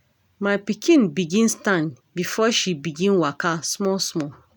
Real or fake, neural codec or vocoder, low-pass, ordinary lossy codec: real; none; none; none